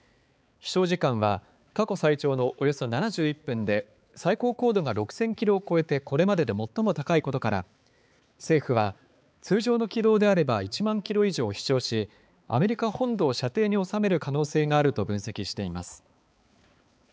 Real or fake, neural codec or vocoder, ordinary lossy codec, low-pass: fake; codec, 16 kHz, 4 kbps, X-Codec, HuBERT features, trained on balanced general audio; none; none